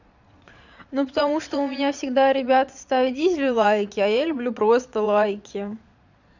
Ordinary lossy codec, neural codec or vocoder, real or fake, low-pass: none; vocoder, 22.05 kHz, 80 mel bands, Vocos; fake; 7.2 kHz